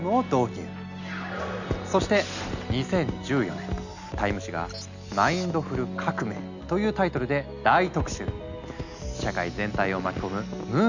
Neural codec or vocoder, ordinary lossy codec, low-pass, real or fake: none; none; 7.2 kHz; real